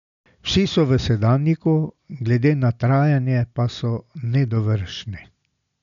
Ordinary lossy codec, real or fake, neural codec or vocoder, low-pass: none; real; none; 7.2 kHz